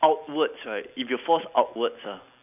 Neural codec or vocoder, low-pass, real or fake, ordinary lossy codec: none; 3.6 kHz; real; none